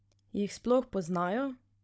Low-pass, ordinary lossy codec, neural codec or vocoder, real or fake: none; none; codec, 16 kHz, 16 kbps, FunCodec, trained on Chinese and English, 50 frames a second; fake